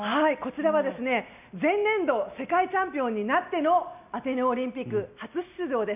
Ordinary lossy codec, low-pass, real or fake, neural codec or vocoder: none; 3.6 kHz; real; none